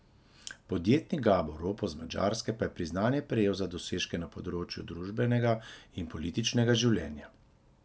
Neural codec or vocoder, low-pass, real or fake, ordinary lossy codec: none; none; real; none